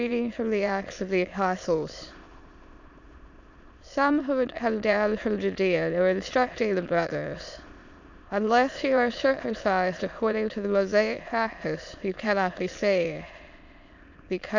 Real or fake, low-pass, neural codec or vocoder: fake; 7.2 kHz; autoencoder, 22.05 kHz, a latent of 192 numbers a frame, VITS, trained on many speakers